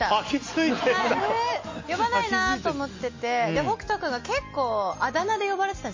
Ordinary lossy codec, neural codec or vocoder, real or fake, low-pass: MP3, 32 kbps; none; real; 7.2 kHz